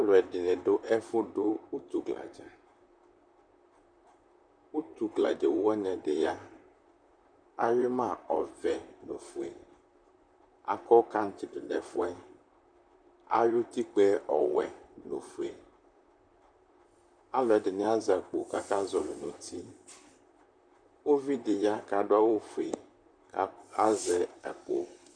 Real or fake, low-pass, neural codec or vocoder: fake; 9.9 kHz; vocoder, 44.1 kHz, 128 mel bands, Pupu-Vocoder